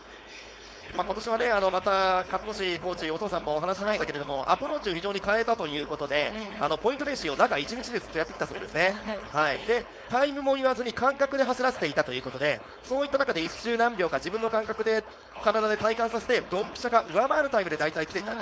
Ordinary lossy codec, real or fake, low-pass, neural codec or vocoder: none; fake; none; codec, 16 kHz, 4.8 kbps, FACodec